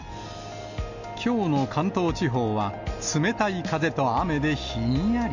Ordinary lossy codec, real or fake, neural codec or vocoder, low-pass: none; real; none; 7.2 kHz